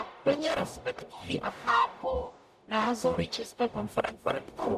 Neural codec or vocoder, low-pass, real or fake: codec, 44.1 kHz, 0.9 kbps, DAC; 14.4 kHz; fake